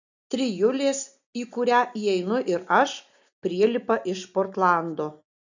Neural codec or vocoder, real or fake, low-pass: none; real; 7.2 kHz